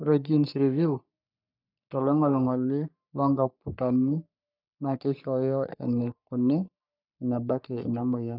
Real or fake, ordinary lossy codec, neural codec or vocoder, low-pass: fake; none; codec, 44.1 kHz, 3.4 kbps, Pupu-Codec; 5.4 kHz